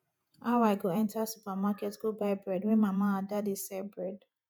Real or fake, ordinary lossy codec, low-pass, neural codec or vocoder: fake; none; none; vocoder, 48 kHz, 128 mel bands, Vocos